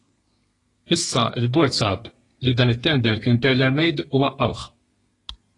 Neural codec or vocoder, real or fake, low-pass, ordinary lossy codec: codec, 32 kHz, 1.9 kbps, SNAC; fake; 10.8 kHz; AAC, 32 kbps